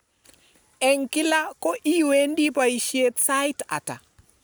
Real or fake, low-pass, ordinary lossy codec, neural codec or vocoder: real; none; none; none